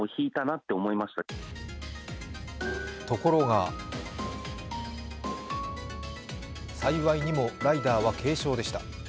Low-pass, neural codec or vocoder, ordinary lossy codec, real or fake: none; none; none; real